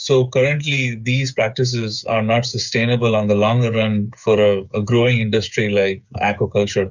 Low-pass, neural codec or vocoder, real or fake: 7.2 kHz; codec, 16 kHz, 8 kbps, FreqCodec, smaller model; fake